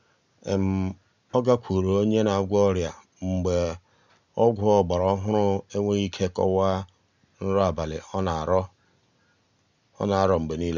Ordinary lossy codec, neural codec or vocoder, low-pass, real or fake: none; none; 7.2 kHz; real